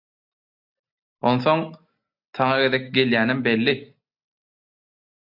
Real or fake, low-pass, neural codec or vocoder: real; 5.4 kHz; none